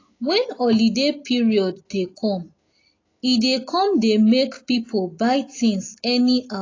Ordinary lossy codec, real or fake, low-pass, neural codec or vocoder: AAC, 32 kbps; real; 7.2 kHz; none